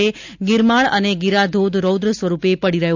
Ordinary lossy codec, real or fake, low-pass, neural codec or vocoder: MP3, 64 kbps; real; 7.2 kHz; none